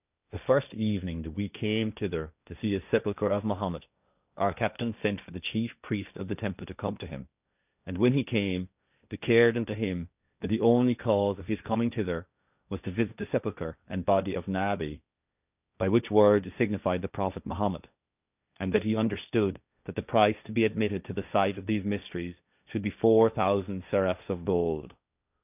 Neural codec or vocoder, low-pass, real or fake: codec, 16 kHz, 1.1 kbps, Voila-Tokenizer; 3.6 kHz; fake